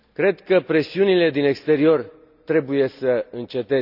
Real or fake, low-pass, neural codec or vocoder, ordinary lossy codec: real; 5.4 kHz; none; none